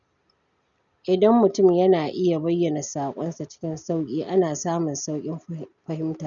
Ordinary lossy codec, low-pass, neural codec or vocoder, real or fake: none; 7.2 kHz; none; real